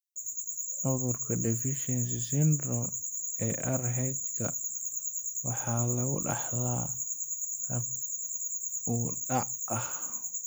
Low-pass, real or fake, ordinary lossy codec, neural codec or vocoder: none; real; none; none